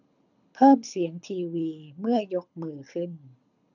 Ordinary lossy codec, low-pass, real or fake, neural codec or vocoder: none; 7.2 kHz; fake; codec, 24 kHz, 6 kbps, HILCodec